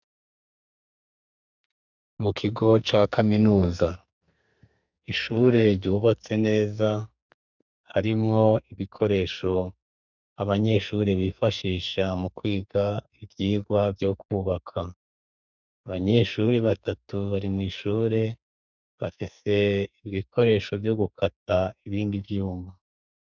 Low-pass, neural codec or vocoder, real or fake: 7.2 kHz; codec, 44.1 kHz, 2.6 kbps, SNAC; fake